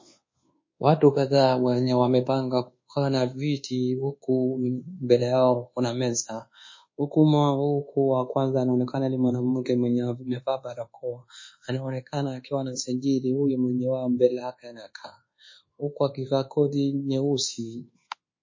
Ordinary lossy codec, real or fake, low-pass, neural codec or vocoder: MP3, 32 kbps; fake; 7.2 kHz; codec, 24 kHz, 1.2 kbps, DualCodec